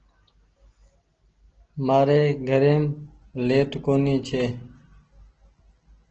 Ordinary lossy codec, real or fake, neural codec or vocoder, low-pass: Opus, 16 kbps; real; none; 7.2 kHz